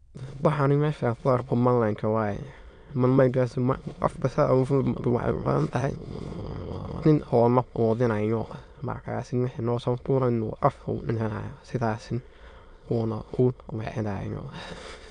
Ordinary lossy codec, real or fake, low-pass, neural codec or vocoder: MP3, 96 kbps; fake; 9.9 kHz; autoencoder, 22.05 kHz, a latent of 192 numbers a frame, VITS, trained on many speakers